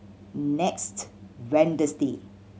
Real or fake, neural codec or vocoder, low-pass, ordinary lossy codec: real; none; none; none